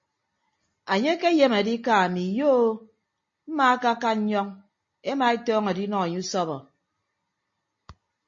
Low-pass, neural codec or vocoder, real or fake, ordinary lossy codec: 7.2 kHz; none; real; MP3, 32 kbps